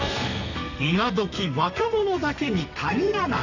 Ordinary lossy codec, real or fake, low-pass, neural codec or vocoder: none; fake; 7.2 kHz; codec, 32 kHz, 1.9 kbps, SNAC